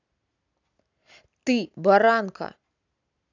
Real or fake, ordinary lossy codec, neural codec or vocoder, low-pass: real; none; none; 7.2 kHz